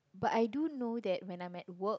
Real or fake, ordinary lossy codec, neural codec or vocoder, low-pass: real; none; none; none